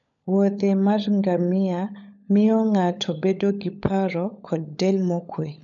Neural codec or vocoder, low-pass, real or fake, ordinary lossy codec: codec, 16 kHz, 16 kbps, FunCodec, trained on LibriTTS, 50 frames a second; 7.2 kHz; fake; none